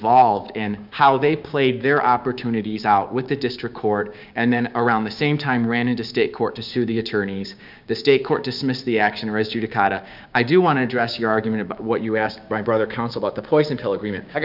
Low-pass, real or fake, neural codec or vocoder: 5.4 kHz; fake; codec, 16 kHz, 6 kbps, DAC